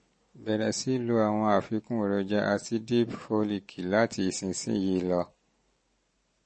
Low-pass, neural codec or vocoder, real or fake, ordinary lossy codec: 9.9 kHz; none; real; MP3, 32 kbps